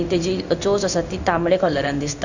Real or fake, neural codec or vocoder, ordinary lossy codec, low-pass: fake; codec, 16 kHz in and 24 kHz out, 1 kbps, XY-Tokenizer; none; 7.2 kHz